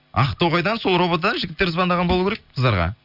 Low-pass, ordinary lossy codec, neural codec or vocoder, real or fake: 5.4 kHz; none; none; real